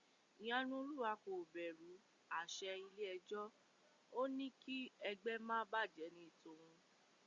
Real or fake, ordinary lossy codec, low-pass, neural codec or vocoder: real; Opus, 64 kbps; 7.2 kHz; none